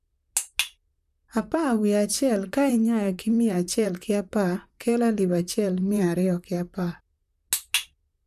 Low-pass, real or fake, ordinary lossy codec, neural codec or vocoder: 14.4 kHz; fake; none; vocoder, 44.1 kHz, 128 mel bands, Pupu-Vocoder